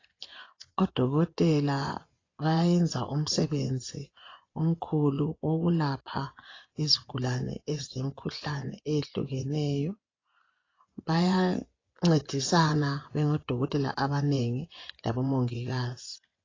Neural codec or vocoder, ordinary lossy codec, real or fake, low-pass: vocoder, 24 kHz, 100 mel bands, Vocos; AAC, 32 kbps; fake; 7.2 kHz